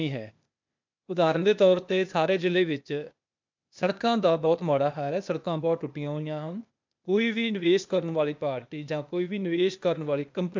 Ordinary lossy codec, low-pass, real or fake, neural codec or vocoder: MP3, 64 kbps; 7.2 kHz; fake; codec, 16 kHz, 0.8 kbps, ZipCodec